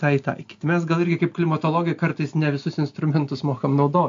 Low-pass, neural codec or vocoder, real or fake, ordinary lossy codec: 7.2 kHz; none; real; AAC, 64 kbps